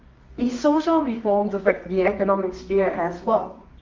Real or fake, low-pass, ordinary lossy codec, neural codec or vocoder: fake; 7.2 kHz; Opus, 32 kbps; codec, 24 kHz, 0.9 kbps, WavTokenizer, medium music audio release